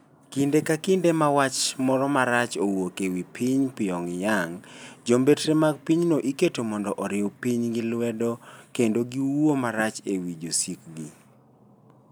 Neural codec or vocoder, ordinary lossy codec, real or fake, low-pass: none; none; real; none